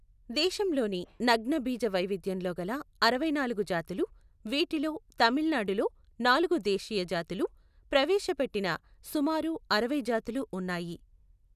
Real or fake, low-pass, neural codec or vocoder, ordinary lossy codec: real; 14.4 kHz; none; none